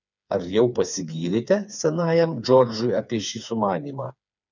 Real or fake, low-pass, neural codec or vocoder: fake; 7.2 kHz; codec, 16 kHz, 4 kbps, FreqCodec, smaller model